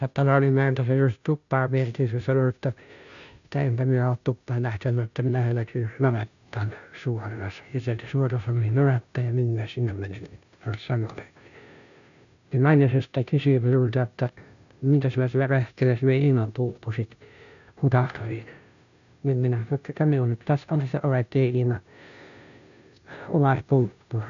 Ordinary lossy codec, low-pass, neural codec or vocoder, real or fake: none; 7.2 kHz; codec, 16 kHz, 0.5 kbps, FunCodec, trained on Chinese and English, 25 frames a second; fake